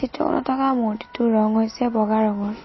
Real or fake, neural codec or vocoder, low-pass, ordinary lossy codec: real; none; 7.2 kHz; MP3, 24 kbps